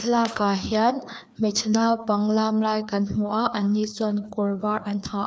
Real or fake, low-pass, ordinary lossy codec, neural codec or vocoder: fake; none; none; codec, 16 kHz, 2 kbps, FreqCodec, larger model